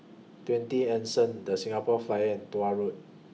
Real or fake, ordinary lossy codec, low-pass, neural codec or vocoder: real; none; none; none